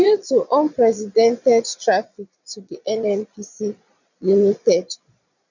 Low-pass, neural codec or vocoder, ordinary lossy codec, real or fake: 7.2 kHz; vocoder, 22.05 kHz, 80 mel bands, Vocos; none; fake